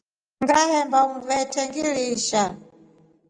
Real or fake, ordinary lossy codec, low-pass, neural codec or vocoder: real; Opus, 32 kbps; 9.9 kHz; none